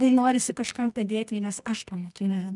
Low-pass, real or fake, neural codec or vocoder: 10.8 kHz; fake; codec, 24 kHz, 0.9 kbps, WavTokenizer, medium music audio release